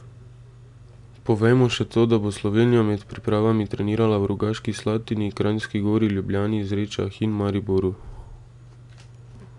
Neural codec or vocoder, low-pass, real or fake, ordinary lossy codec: none; 10.8 kHz; real; none